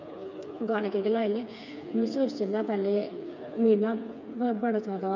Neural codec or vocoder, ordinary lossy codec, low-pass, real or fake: codec, 16 kHz, 4 kbps, FreqCodec, smaller model; none; 7.2 kHz; fake